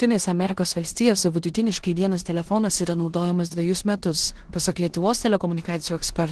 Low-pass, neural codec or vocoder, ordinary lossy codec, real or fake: 10.8 kHz; codec, 16 kHz in and 24 kHz out, 0.9 kbps, LongCat-Audio-Codec, four codebook decoder; Opus, 16 kbps; fake